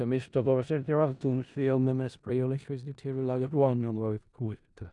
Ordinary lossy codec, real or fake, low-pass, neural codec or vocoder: none; fake; 10.8 kHz; codec, 16 kHz in and 24 kHz out, 0.4 kbps, LongCat-Audio-Codec, four codebook decoder